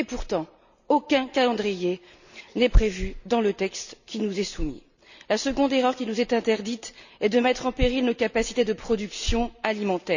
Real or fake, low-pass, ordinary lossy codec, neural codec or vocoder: real; 7.2 kHz; none; none